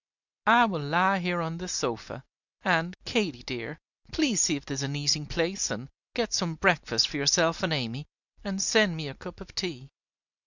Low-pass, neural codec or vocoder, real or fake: 7.2 kHz; none; real